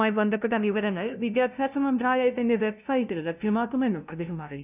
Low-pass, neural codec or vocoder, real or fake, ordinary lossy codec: 3.6 kHz; codec, 16 kHz, 0.5 kbps, FunCodec, trained on LibriTTS, 25 frames a second; fake; none